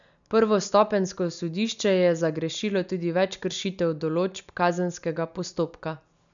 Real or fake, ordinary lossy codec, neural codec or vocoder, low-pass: real; none; none; 7.2 kHz